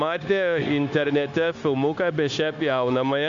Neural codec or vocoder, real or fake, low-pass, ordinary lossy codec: codec, 16 kHz, 0.9 kbps, LongCat-Audio-Codec; fake; 7.2 kHz; AAC, 64 kbps